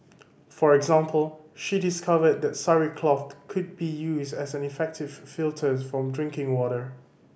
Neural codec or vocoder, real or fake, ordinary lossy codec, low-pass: none; real; none; none